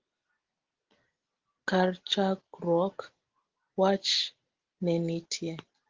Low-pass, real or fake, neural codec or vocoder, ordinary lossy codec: 7.2 kHz; real; none; Opus, 16 kbps